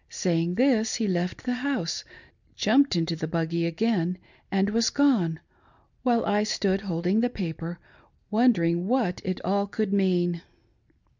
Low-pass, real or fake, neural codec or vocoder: 7.2 kHz; real; none